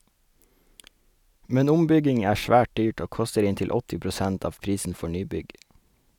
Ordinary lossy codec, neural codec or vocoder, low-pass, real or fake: none; none; 19.8 kHz; real